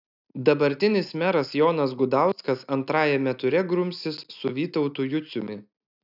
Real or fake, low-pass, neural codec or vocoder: real; 5.4 kHz; none